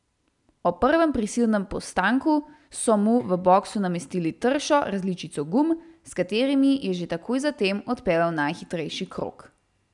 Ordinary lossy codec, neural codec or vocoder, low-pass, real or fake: none; none; 10.8 kHz; real